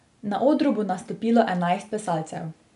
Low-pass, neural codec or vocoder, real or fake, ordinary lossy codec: 10.8 kHz; none; real; none